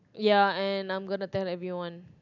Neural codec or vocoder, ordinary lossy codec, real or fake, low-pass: none; none; real; 7.2 kHz